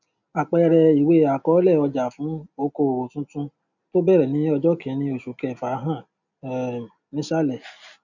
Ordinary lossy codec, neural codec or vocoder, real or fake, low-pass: none; none; real; 7.2 kHz